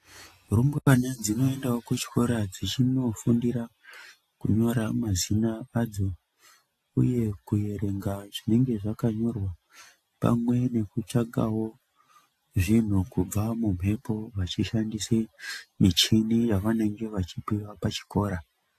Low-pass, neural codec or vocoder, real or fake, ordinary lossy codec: 14.4 kHz; none; real; AAC, 64 kbps